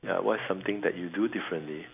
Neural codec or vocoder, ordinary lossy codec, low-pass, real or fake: none; none; 3.6 kHz; real